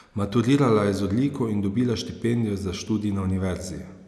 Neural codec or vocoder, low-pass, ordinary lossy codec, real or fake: none; none; none; real